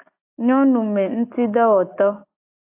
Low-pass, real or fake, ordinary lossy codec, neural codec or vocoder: 3.6 kHz; real; AAC, 24 kbps; none